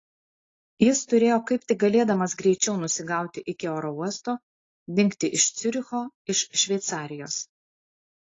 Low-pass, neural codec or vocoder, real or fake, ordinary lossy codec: 7.2 kHz; none; real; AAC, 32 kbps